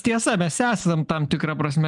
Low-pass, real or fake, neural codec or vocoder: 10.8 kHz; real; none